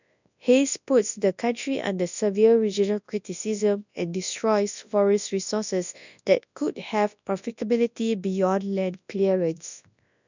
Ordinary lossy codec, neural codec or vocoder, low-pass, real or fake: none; codec, 24 kHz, 0.9 kbps, WavTokenizer, large speech release; 7.2 kHz; fake